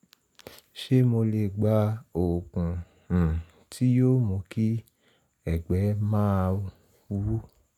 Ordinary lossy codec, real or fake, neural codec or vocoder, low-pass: none; real; none; 19.8 kHz